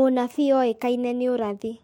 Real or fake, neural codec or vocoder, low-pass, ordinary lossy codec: fake; autoencoder, 48 kHz, 128 numbers a frame, DAC-VAE, trained on Japanese speech; 19.8 kHz; MP3, 64 kbps